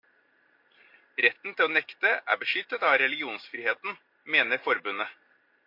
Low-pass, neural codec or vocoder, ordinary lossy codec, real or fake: 5.4 kHz; none; MP3, 48 kbps; real